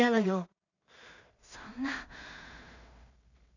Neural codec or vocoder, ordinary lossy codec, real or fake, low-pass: codec, 16 kHz in and 24 kHz out, 0.4 kbps, LongCat-Audio-Codec, two codebook decoder; none; fake; 7.2 kHz